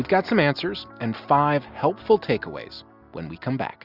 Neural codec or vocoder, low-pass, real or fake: none; 5.4 kHz; real